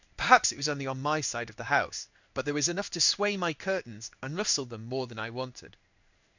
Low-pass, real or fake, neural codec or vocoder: 7.2 kHz; fake; codec, 16 kHz in and 24 kHz out, 1 kbps, XY-Tokenizer